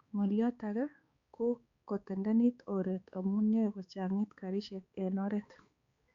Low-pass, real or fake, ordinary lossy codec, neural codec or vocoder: 7.2 kHz; fake; Opus, 64 kbps; codec, 16 kHz, 4 kbps, X-Codec, HuBERT features, trained on balanced general audio